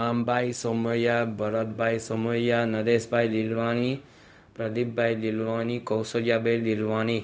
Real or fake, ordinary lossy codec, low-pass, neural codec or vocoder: fake; none; none; codec, 16 kHz, 0.4 kbps, LongCat-Audio-Codec